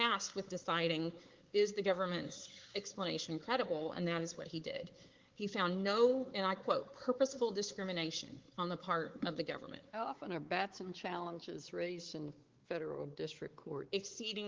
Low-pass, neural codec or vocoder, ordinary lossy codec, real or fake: 7.2 kHz; codec, 16 kHz, 4 kbps, X-Codec, WavLM features, trained on Multilingual LibriSpeech; Opus, 16 kbps; fake